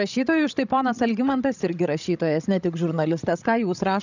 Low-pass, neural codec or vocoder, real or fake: 7.2 kHz; codec, 16 kHz, 16 kbps, FreqCodec, larger model; fake